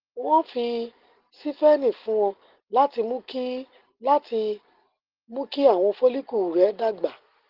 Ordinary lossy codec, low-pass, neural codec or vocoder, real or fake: Opus, 16 kbps; 5.4 kHz; none; real